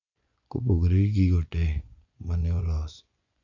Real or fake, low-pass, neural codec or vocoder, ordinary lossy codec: fake; 7.2 kHz; vocoder, 24 kHz, 100 mel bands, Vocos; none